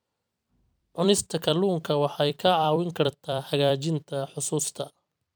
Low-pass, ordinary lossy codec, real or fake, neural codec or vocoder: none; none; fake; vocoder, 44.1 kHz, 128 mel bands every 256 samples, BigVGAN v2